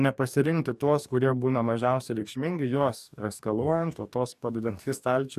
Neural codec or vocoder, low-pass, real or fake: codec, 44.1 kHz, 2.6 kbps, DAC; 14.4 kHz; fake